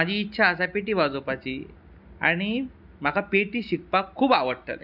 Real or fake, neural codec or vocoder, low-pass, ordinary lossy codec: real; none; 5.4 kHz; none